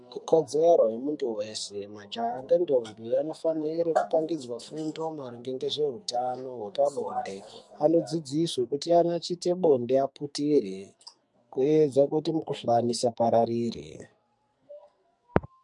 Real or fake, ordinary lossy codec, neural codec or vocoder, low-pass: fake; MP3, 64 kbps; codec, 44.1 kHz, 2.6 kbps, SNAC; 10.8 kHz